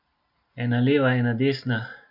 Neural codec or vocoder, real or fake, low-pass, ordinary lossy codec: none; real; 5.4 kHz; none